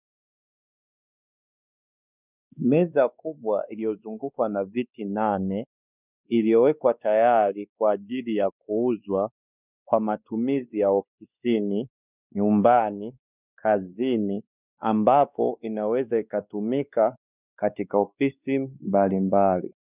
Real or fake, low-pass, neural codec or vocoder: fake; 3.6 kHz; codec, 16 kHz, 2 kbps, X-Codec, WavLM features, trained on Multilingual LibriSpeech